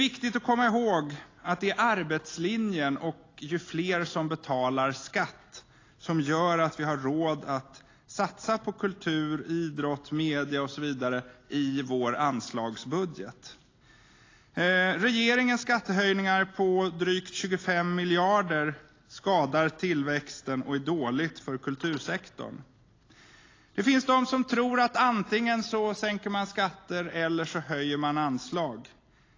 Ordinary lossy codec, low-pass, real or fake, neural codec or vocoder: AAC, 32 kbps; 7.2 kHz; real; none